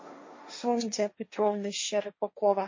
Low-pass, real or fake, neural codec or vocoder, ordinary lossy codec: 7.2 kHz; fake; codec, 16 kHz, 1.1 kbps, Voila-Tokenizer; MP3, 32 kbps